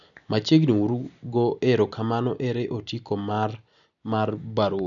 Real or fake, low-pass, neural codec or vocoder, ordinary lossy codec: real; 7.2 kHz; none; none